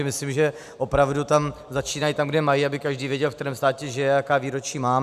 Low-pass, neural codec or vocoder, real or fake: 14.4 kHz; none; real